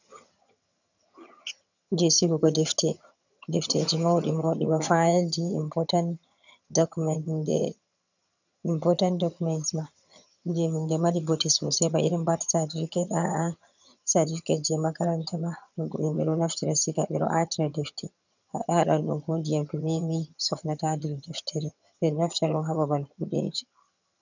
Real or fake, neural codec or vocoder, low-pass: fake; vocoder, 22.05 kHz, 80 mel bands, HiFi-GAN; 7.2 kHz